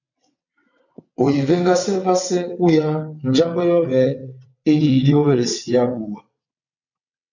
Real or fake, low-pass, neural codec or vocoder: fake; 7.2 kHz; vocoder, 22.05 kHz, 80 mel bands, WaveNeXt